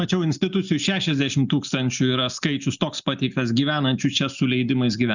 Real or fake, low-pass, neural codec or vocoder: real; 7.2 kHz; none